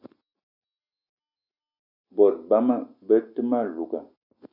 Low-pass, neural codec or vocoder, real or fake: 5.4 kHz; none; real